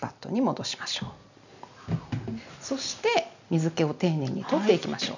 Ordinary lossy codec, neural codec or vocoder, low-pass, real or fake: none; none; 7.2 kHz; real